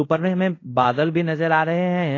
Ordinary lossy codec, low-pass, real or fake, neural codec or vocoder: AAC, 32 kbps; 7.2 kHz; fake; codec, 24 kHz, 0.5 kbps, DualCodec